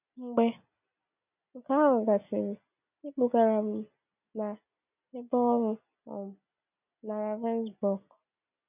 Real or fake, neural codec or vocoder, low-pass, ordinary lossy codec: real; none; 3.6 kHz; none